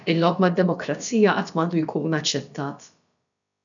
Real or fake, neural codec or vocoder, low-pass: fake; codec, 16 kHz, about 1 kbps, DyCAST, with the encoder's durations; 7.2 kHz